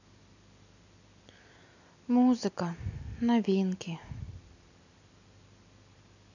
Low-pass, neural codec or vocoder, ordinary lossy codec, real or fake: 7.2 kHz; none; none; real